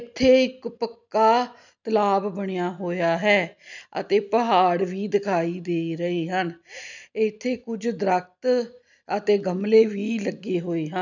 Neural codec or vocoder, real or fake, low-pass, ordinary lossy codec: none; real; 7.2 kHz; none